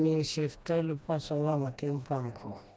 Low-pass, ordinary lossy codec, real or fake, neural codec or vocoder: none; none; fake; codec, 16 kHz, 1 kbps, FreqCodec, smaller model